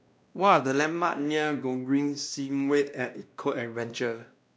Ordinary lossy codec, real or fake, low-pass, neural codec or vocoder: none; fake; none; codec, 16 kHz, 1 kbps, X-Codec, WavLM features, trained on Multilingual LibriSpeech